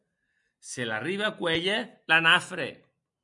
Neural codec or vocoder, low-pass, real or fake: none; 10.8 kHz; real